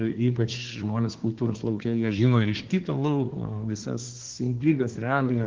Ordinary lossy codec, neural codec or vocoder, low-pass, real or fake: Opus, 16 kbps; codec, 24 kHz, 1 kbps, SNAC; 7.2 kHz; fake